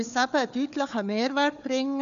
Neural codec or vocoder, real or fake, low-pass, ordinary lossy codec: codec, 16 kHz, 4 kbps, FunCodec, trained on Chinese and English, 50 frames a second; fake; 7.2 kHz; none